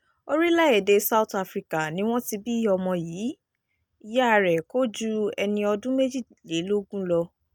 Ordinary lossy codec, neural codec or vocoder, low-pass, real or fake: none; none; none; real